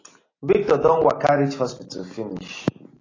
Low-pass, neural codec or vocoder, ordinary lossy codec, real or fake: 7.2 kHz; none; AAC, 32 kbps; real